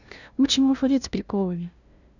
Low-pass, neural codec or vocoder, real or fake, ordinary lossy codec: 7.2 kHz; codec, 16 kHz, 0.5 kbps, FunCodec, trained on LibriTTS, 25 frames a second; fake; none